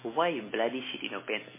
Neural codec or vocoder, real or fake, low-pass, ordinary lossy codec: none; real; 3.6 kHz; MP3, 16 kbps